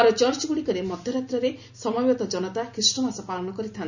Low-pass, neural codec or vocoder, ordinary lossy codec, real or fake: 7.2 kHz; none; none; real